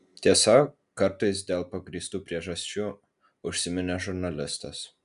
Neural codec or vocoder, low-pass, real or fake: none; 10.8 kHz; real